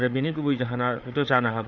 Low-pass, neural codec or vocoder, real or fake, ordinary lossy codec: 7.2 kHz; codec, 16 kHz, 8 kbps, FreqCodec, larger model; fake; none